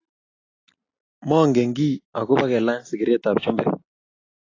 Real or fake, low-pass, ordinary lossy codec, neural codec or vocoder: real; 7.2 kHz; AAC, 32 kbps; none